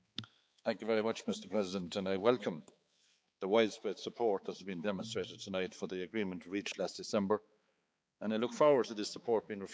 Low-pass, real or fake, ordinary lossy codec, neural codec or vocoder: none; fake; none; codec, 16 kHz, 4 kbps, X-Codec, HuBERT features, trained on balanced general audio